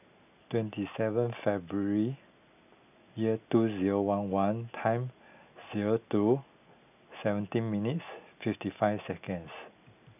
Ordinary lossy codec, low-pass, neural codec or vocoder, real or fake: none; 3.6 kHz; none; real